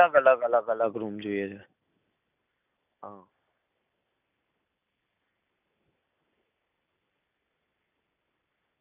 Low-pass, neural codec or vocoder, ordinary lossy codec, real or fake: 3.6 kHz; none; none; real